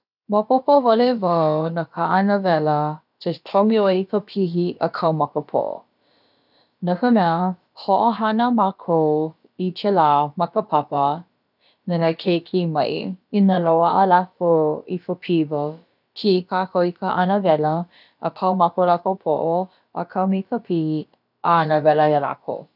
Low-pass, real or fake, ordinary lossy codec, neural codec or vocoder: 5.4 kHz; fake; none; codec, 16 kHz, about 1 kbps, DyCAST, with the encoder's durations